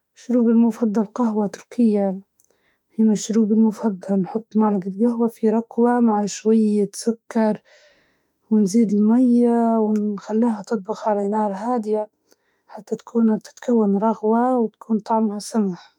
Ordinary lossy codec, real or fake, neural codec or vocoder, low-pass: none; fake; autoencoder, 48 kHz, 32 numbers a frame, DAC-VAE, trained on Japanese speech; 19.8 kHz